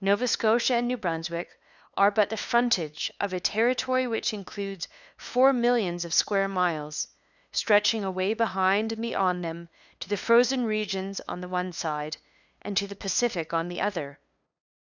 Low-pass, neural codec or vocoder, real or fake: 7.2 kHz; codec, 16 kHz, 2 kbps, FunCodec, trained on LibriTTS, 25 frames a second; fake